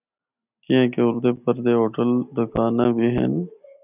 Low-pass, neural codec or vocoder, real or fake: 3.6 kHz; none; real